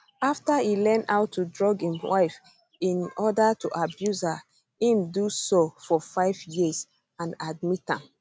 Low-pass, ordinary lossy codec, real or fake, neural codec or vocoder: none; none; real; none